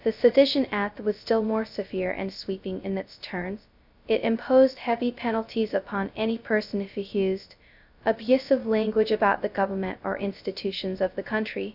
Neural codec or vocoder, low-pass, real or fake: codec, 16 kHz, 0.2 kbps, FocalCodec; 5.4 kHz; fake